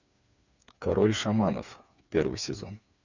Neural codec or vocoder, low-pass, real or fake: codec, 16 kHz, 4 kbps, FreqCodec, smaller model; 7.2 kHz; fake